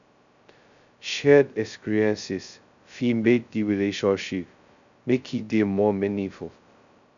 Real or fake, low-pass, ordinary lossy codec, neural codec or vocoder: fake; 7.2 kHz; none; codec, 16 kHz, 0.2 kbps, FocalCodec